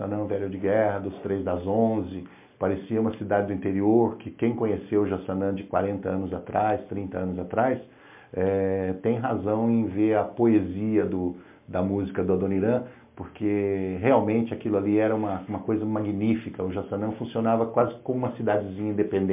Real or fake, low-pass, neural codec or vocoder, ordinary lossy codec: real; 3.6 kHz; none; MP3, 32 kbps